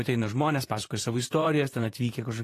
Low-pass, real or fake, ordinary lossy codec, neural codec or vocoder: 14.4 kHz; fake; AAC, 48 kbps; vocoder, 44.1 kHz, 128 mel bands, Pupu-Vocoder